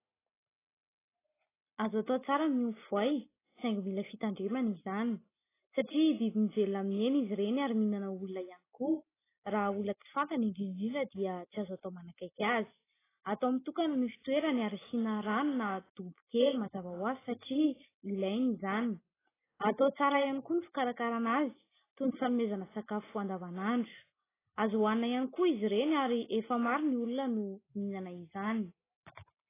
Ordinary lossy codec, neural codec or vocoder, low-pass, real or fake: AAC, 16 kbps; none; 3.6 kHz; real